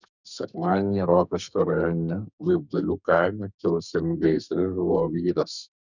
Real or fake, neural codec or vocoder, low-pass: fake; codec, 32 kHz, 1.9 kbps, SNAC; 7.2 kHz